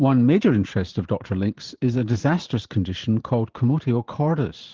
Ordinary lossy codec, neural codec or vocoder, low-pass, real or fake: Opus, 16 kbps; vocoder, 44.1 kHz, 128 mel bands every 512 samples, BigVGAN v2; 7.2 kHz; fake